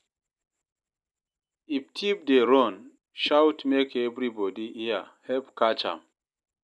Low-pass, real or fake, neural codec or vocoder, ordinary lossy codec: none; real; none; none